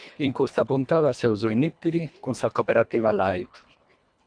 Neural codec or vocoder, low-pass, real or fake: codec, 24 kHz, 1.5 kbps, HILCodec; 9.9 kHz; fake